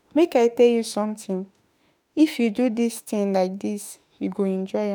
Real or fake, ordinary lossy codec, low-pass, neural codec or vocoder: fake; none; none; autoencoder, 48 kHz, 32 numbers a frame, DAC-VAE, trained on Japanese speech